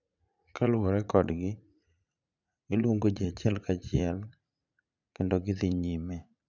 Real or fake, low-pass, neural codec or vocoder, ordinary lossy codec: fake; 7.2 kHz; vocoder, 44.1 kHz, 80 mel bands, Vocos; none